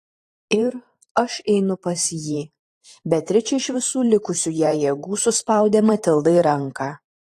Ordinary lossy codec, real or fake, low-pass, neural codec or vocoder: AAC, 48 kbps; fake; 14.4 kHz; vocoder, 44.1 kHz, 128 mel bands every 512 samples, BigVGAN v2